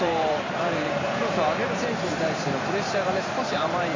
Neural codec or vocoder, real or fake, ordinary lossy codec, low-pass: vocoder, 44.1 kHz, 128 mel bands every 512 samples, BigVGAN v2; fake; AAC, 32 kbps; 7.2 kHz